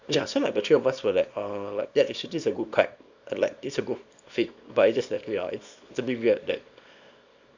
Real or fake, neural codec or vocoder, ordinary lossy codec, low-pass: fake; codec, 24 kHz, 0.9 kbps, WavTokenizer, small release; Opus, 64 kbps; 7.2 kHz